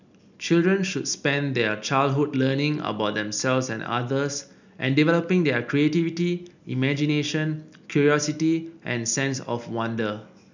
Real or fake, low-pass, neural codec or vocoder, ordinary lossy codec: real; 7.2 kHz; none; none